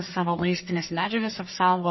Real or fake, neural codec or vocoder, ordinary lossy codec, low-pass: fake; codec, 44.1 kHz, 1.7 kbps, Pupu-Codec; MP3, 24 kbps; 7.2 kHz